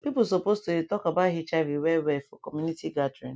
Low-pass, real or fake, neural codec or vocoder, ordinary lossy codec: none; real; none; none